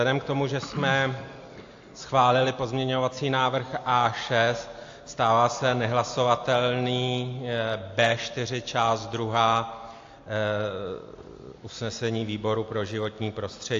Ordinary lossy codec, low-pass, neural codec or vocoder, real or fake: AAC, 48 kbps; 7.2 kHz; none; real